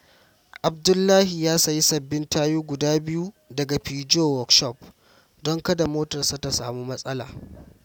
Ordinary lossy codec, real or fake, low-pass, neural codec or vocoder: none; real; 19.8 kHz; none